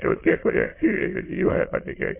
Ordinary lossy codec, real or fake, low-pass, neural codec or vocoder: MP3, 24 kbps; fake; 3.6 kHz; autoencoder, 22.05 kHz, a latent of 192 numbers a frame, VITS, trained on many speakers